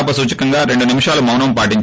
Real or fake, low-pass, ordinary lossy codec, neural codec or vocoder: real; none; none; none